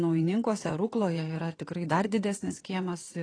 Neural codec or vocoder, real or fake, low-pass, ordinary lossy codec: vocoder, 22.05 kHz, 80 mel bands, WaveNeXt; fake; 9.9 kHz; AAC, 32 kbps